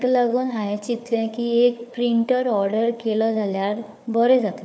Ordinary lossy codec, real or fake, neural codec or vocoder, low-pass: none; fake; codec, 16 kHz, 4 kbps, FunCodec, trained on Chinese and English, 50 frames a second; none